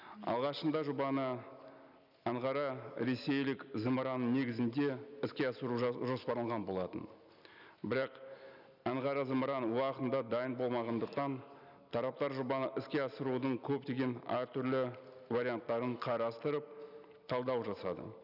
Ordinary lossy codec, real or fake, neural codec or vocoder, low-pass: none; real; none; 5.4 kHz